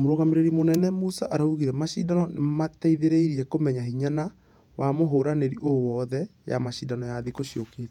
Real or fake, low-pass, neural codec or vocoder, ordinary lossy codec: fake; 19.8 kHz; vocoder, 48 kHz, 128 mel bands, Vocos; none